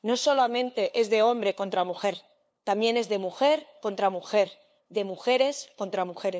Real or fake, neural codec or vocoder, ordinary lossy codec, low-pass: fake; codec, 16 kHz, 2 kbps, FunCodec, trained on LibriTTS, 25 frames a second; none; none